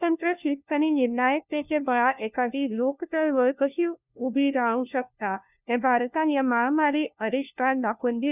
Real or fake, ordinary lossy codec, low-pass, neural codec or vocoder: fake; none; 3.6 kHz; codec, 16 kHz, 1 kbps, FunCodec, trained on LibriTTS, 50 frames a second